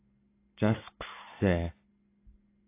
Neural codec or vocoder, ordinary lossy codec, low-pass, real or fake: none; AAC, 24 kbps; 3.6 kHz; real